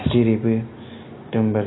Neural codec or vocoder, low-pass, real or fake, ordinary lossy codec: none; 7.2 kHz; real; AAC, 16 kbps